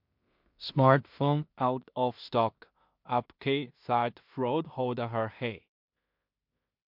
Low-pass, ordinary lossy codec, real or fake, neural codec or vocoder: 5.4 kHz; MP3, 48 kbps; fake; codec, 16 kHz in and 24 kHz out, 0.4 kbps, LongCat-Audio-Codec, two codebook decoder